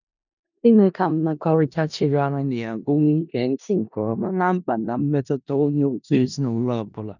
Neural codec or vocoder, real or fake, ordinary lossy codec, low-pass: codec, 16 kHz in and 24 kHz out, 0.4 kbps, LongCat-Audio-Codec, four codebook decoder; fake; none; 7.2 kHz